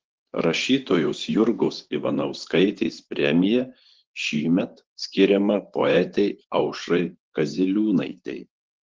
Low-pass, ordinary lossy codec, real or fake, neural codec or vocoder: 7.2 kHz; Opus, 16 kbps; fake; vocoder, 24 kHz, 100 mel bands, Vocos